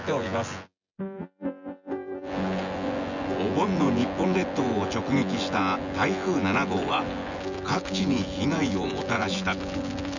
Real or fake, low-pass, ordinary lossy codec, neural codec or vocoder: fake; 7.2 kHz; none; vocoder, 24 kHz, 100 mel bands, Vocos